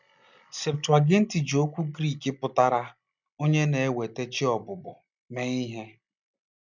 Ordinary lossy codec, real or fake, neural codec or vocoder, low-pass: none; real; none; 7.2 kHz